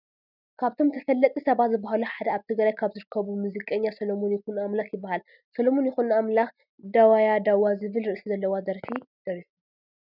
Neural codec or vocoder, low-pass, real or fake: none; 5.4 kHz; real